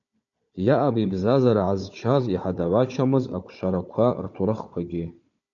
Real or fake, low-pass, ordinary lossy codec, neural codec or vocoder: fake; 7.2 kHz; MP3, 48 kbps; codec, 16 kHz, 4 kbps, FunCodec, trained on Chinese and English, 50 frames a second